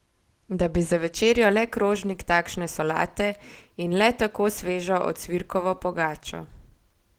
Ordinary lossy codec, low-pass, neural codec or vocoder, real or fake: Opus, 16 kbps; 19.8 kHz; none; real